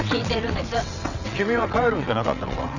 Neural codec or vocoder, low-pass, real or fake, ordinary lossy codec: vocoder, 22.05 kHz, 80 mel bands, WaveNeXt; 7.2 kHz; fake; none